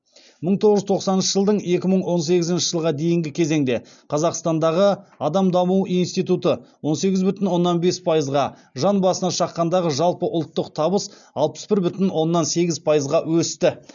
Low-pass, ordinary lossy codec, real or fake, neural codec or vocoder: 7.2 kHz; none; real; none